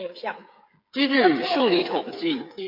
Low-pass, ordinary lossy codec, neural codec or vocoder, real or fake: 5.4 kHz; AAC, 32 kbps; codec, 16 kHz, 8 kbps, FreqCodec, smaller model; fake